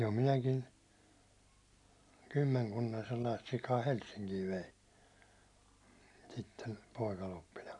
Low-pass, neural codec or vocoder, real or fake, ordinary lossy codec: 10.8 kHz; none; real; none